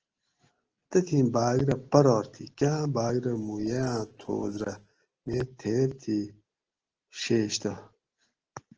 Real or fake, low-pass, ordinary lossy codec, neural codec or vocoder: real; 7.2 kHz; Opus, 16 kbps; none